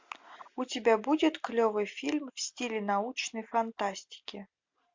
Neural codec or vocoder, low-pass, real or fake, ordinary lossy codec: none; 7.2 kHz; real; MP3, 64 kbps